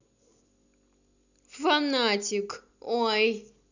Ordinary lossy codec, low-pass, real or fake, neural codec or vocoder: none; 7.2 kHz; real; none